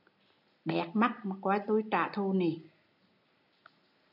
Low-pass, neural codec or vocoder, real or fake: 5.4 kHz; none; real